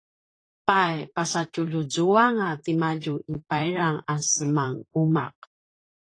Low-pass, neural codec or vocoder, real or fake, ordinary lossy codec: 9.9 kHz; vocoder, 44.1 kHz, 128 mel bands, Pupu-Vocoder; fake; AAC, 32 kbps